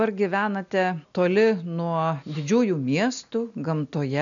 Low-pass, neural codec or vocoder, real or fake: 7.2 kHz; none; real